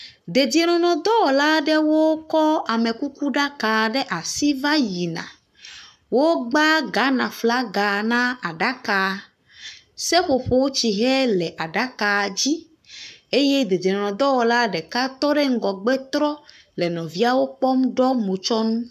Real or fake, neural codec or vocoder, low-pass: fake; codec, 44.1 kHz, 7.8 kbps, Pupu-Codec; 14.4 kHz